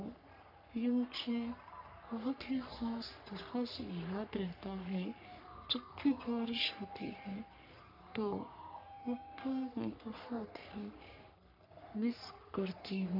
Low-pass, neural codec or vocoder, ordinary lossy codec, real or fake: 5.4 kHz; codec, 44.1 kHz, 3.4 kbps, Pupu-Codec; none; fake